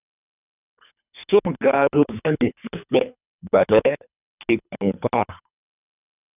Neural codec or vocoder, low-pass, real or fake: codec, 24 kHz, 3 kbps, HILCodec; 3.6 kHz; fake